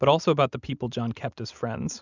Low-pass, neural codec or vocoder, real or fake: 7.2 kHz; none; real